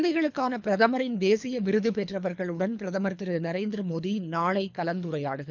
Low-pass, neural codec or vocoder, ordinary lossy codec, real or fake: 7.2 kHz; codec, 24 kHz, 3 kbps, HILCodec; none; fake